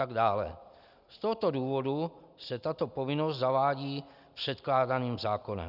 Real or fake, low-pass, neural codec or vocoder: real; 5.4 kHz; none